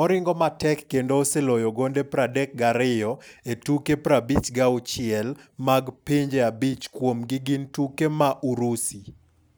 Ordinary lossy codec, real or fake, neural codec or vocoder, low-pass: none; real; none; none